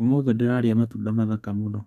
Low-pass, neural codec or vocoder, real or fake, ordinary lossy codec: 14.4 kHz; codec, 32 kHz, 1.9 kbps, SNAC; fake; none